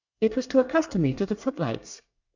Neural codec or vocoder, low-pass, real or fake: codec, 24 kHz, 1 kbps, SNAC; 7.2 kHz; fake